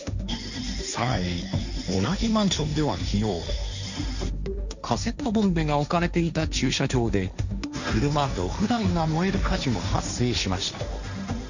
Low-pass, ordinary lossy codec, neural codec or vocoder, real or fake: 7.2 kHz; none; codec, 16 kHz, 1.1 kbps, Voila-Tokenizer; fake